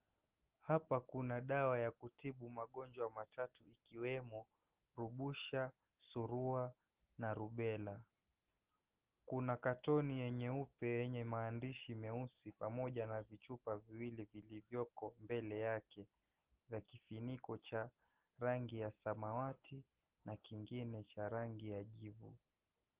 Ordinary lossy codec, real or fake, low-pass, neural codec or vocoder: Opus, 16 kbps; real; 3.6 kHz; none